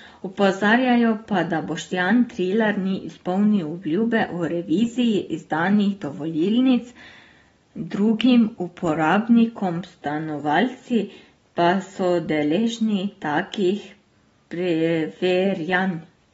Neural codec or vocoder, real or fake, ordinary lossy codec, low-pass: none; real; AAC, 24 kbps; 19.8 kHz